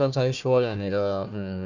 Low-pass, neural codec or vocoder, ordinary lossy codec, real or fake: 7.2 kHz; codec, 16 kHz, 1 kbps, FunCodec, trained on Chinese and English, 50 frames a second; none; fake